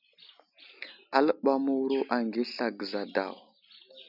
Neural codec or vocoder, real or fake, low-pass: none; real; 5.4 kHz